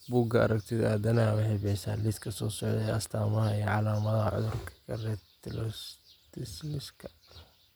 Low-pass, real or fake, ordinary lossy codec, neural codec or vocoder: none; real; none; none